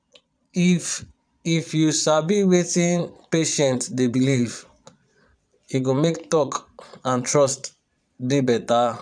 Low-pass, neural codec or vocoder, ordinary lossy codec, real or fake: 9.9 kHz; vocoder, 22.05 kHz, 80 mel bands, Vocos; none; fake